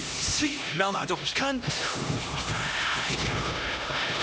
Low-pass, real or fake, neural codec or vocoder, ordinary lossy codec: none; fake; codec, 16 kHz, 1 kbps, X-Codec, HuBERT features, trained on LibriSpeech; none